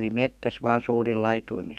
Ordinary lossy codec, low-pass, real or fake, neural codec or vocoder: none; 14.4 kHz; fake; codec, 32 kHz, 1.9 kbps, SNAC